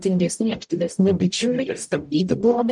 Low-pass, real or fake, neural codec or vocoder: 10.8 kHz; fake; codec, 44.1 kHz, 0.9 kbps, DAC